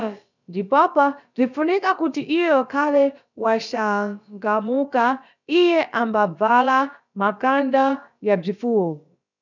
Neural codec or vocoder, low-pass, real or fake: codec, 16 kHz, about 1 kbps, DyCAST, with the encoder's durations; 7.2 kHz; fake